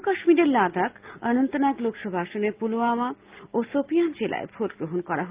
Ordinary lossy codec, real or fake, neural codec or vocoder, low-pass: Opus, 32 kbps; real; none; 3.6 kHz